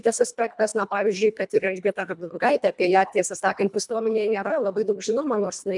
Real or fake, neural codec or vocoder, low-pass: fake; codec, 24 kHz, 1.5 kbps, HILCodec; 10.8 kHz